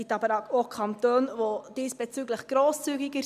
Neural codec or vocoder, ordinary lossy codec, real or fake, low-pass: none; none; real; 14.4 kHz